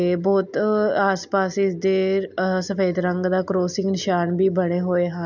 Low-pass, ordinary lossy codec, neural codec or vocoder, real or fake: 7.2 kHz; none; none; real